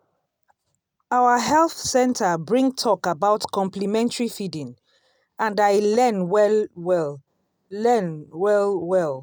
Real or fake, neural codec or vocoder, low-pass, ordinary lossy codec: real; none; none; none